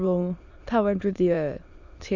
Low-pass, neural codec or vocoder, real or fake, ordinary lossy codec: 7.2 kHz; autoencoder, 22.05 kHz, a latent of 192 numbers a frame, VITS, trained on many speakers; fake; none